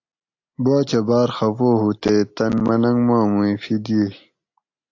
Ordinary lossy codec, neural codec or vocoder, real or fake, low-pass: AAC, 48 kbps; none; real; 7.2 kHz